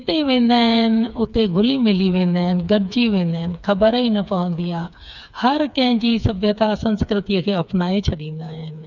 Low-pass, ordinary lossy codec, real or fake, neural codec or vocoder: 7.2 kHz; none; fake; codec, 16 kHz, 4 kbps, FreqCodec, smaller model